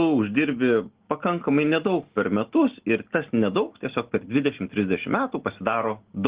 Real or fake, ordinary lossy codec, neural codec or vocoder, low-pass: real; Opus, 16 kbps; none; 3.6 kHz